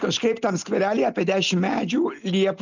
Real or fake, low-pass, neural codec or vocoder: real; 7.2 kHz; none